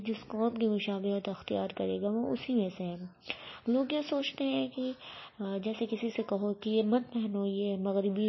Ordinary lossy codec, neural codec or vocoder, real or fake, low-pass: MP3, 24 kbps; none; real; 7.2 kHz